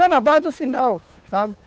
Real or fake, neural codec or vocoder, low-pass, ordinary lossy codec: fake; codec, 16 kHz, 2 kbps, FunCodec, trained on Chinese and English, 25 frames a second; none; none